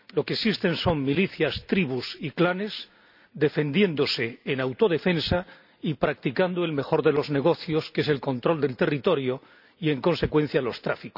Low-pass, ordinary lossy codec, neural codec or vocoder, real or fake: 5.4 kHz; none; none; real